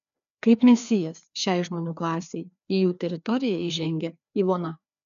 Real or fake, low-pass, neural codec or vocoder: fake; 7.2 kHz; codec, 16 kHz, 2 kbps, FreqCodec, larger model